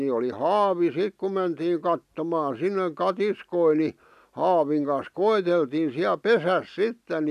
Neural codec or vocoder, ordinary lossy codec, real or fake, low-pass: none; none; real; 14.4 kHz